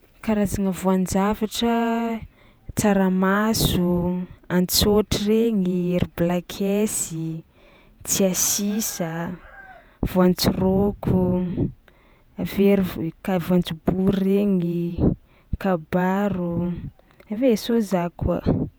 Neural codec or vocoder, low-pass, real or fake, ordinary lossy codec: vocoder, 48 kHz, 128 mel bands, Vocos; none; fake; none